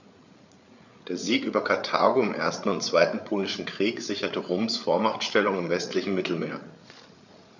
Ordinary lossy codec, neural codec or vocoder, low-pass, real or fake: none; codec, 16 kHz, 8 kbps, FreqCodec, larger model; 7.2 kHz; fake